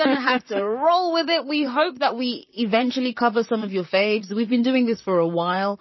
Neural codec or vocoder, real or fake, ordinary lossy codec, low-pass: vocoder, 44.1 kHz, 128 mel bands, Pupu-Vocoder; fake; MP3, 24 kbps; 7.2 kHz